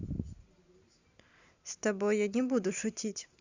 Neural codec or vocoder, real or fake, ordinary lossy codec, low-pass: none; real; Opus, 64 kbps; 7.2 kHz